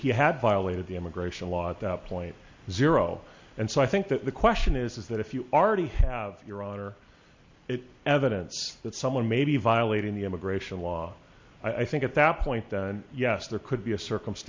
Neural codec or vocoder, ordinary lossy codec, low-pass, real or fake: none; MP3, 48 kbps; 7.2 kHz; real